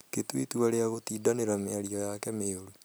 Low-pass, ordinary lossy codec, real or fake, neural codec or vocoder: none; none; real; none